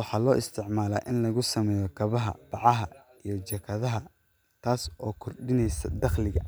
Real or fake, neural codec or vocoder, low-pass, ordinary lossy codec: real; none; none; none